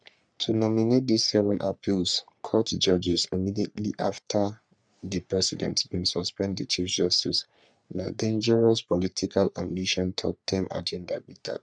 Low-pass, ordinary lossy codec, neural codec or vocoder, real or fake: 9.9 kHz; none; codec, 44.1 kHz, 3.4 kbps, Pupu-Codec; fake